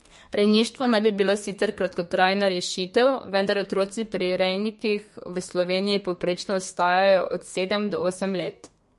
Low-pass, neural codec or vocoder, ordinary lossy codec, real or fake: 14.4 kHz; codec, 32 kHz, 1.9 kbps, SNAC; MP3, 48 kbps; fake